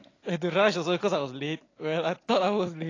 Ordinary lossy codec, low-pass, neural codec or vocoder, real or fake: AAC, 32 kbps; 7.2 kHz; none; real